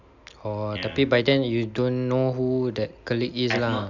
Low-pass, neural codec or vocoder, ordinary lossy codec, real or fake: 7.2 kHz; none; none; real